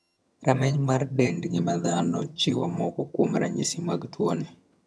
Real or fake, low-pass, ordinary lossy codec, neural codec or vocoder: fake; none; none; vocoder, 22.05 kHz, 80 mel bands, HiFi-GAN